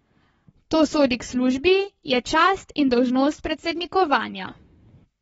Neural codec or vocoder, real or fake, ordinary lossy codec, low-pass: codec, 44.1 kHz, 7.8 kbps, Pupu-Codec; fake; AAC, 24 kbps; 19.8 kHz